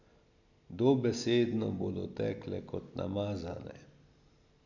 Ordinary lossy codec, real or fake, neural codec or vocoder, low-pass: none; real; none; 7.2 kHz